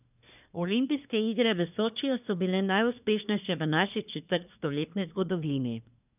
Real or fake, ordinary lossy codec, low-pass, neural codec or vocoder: fake; none; 3.6 kHz; codec, 24 kHz, 1 kbps, SNAC